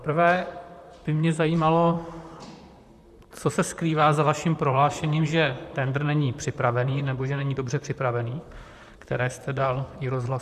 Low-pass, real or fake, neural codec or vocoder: 14.4 kHz; fake; vocoder, 44.1 kHz, 128 mel bands, Pupu-Vocoder